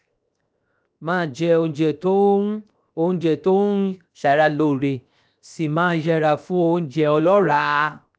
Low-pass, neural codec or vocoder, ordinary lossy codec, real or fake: none; codec, 16 kHz, 0.7 kbps, FocalCodec; none; fake